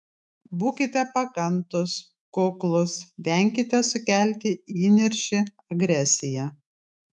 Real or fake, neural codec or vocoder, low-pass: fake; autoencoder, 48 kHz, 128 numbers a frame, DAC-VAE, trained on Japanese speech; 10.8 kHz